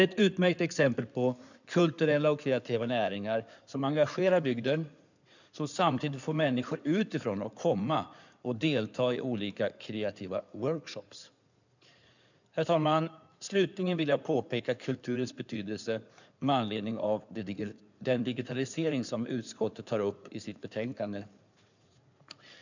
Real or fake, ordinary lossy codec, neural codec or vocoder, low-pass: fake; none; codec, 16 kHz in and 24 kHz out, 2.2 kbps, FireRedTTS-2 codec; 7.2 kHz